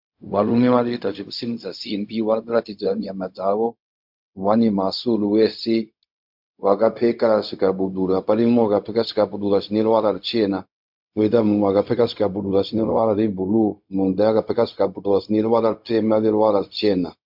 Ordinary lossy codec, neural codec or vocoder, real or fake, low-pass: MP3, 48 kbps; codec, 16 kHz, 0.4 kbps, LongCat-Audio-Codec; fake; 5.4 kHz